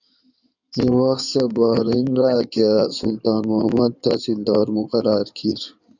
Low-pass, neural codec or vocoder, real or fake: 7.2 kHz; codec, 16 kHz in and 24 kHz out, 2.2 kbps, FireRedTTS-2 codec; fake